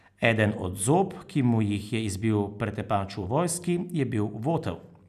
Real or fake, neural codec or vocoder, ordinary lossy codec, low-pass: real; none; none; 14.4 kHz